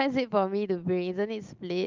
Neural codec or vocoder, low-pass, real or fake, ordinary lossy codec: none; 7.2 kHz; real; Opus, 32 kbps